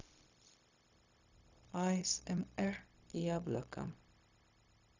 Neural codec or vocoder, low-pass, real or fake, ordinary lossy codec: codec, 16 kHz, 0.4 kbps, LongCat-Audio-Codec; 7.2 kHz; fake; none